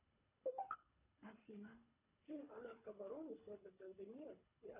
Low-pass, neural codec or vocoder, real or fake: 3.6 kHz; codec, 24 kHz, 3 kbps, HILCodec; fake